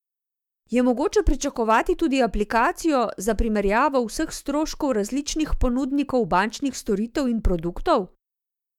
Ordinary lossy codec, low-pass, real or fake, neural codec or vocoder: MP3, 96 kbps; 19.8 kHz; fake; autoencoder, 48 kHz, 128 numbers a frame, DAC-VAE, trained on Japanese speech